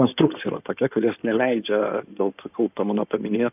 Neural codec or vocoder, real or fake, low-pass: codec, 16 kHz in and 24 kHz out, 2.2 kbps, FireRedTTS-2 codec; fake; 3.6 kHz